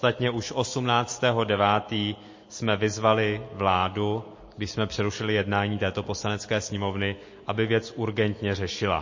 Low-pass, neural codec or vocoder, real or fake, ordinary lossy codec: 7.2 kHz; none; real; MP3, 32 kbps